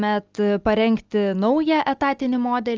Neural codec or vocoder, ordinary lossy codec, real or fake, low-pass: none; Opus, 24 kbps; real; 7.2 kHz